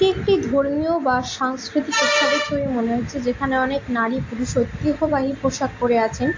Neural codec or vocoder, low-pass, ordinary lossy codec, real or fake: none; 7.2 kHz; AAC, 48 kbps; real